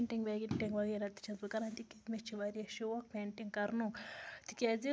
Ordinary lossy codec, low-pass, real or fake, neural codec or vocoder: none; none; real; none